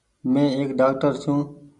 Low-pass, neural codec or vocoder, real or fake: 10.8 kHz; none; real